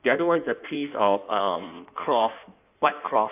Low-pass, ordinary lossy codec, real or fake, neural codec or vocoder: 3.6 kHz; AAC, 32 kbps; fake; codec, 16 kHz in and 24 kHz out, 1.1 kbps, FireRedTTS-2 codec